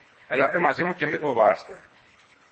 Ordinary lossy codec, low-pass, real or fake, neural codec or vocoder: MP3, 32 kbps; 10.8 kHz; fake; codec, 24 kHz, 1.5 kbps, HILCodec